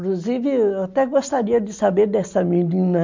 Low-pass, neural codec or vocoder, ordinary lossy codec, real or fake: 7.2 kHz; none; MP3, 64 kbps; real